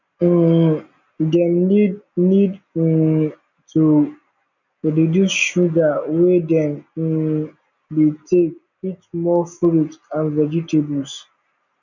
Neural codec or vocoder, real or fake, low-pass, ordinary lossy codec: none; real; 7.2 kHz; none